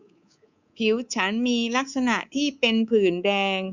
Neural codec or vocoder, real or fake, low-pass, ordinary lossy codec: codec, 24 kHz, 3.1 kbps, DualCodec; fake; 7.2 kHz; Opus, 64 kbps